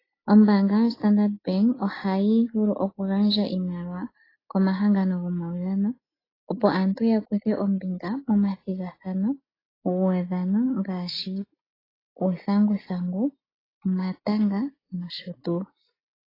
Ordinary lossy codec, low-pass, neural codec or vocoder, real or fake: AAC, 24 kbps; 5.4 kHz; none; real